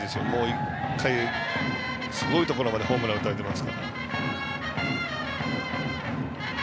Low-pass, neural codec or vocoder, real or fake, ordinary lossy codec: none; none; real; none